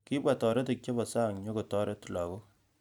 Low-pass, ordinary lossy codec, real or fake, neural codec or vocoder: 19.8 kHz; none; real; none